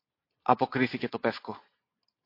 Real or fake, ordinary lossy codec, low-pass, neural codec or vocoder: real; MP3, 32 kbps; 5.4 kHz; none